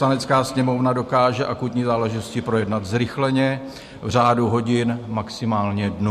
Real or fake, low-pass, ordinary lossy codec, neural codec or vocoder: real; 14.4 kHz; MP3, 64 kbps; none